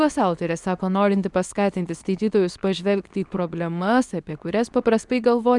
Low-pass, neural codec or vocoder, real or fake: 10.8 kHz; codec, 24 kHz, 0.9 kbps, WavTokenizer, medium speech release version 2; fake